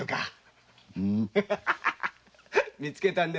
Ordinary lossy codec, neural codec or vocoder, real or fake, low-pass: none; none; real; none